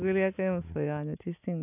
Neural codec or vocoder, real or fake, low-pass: none; real; 3.6 kHz